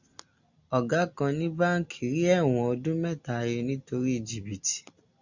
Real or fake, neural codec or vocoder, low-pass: real; none; 7.2 kHz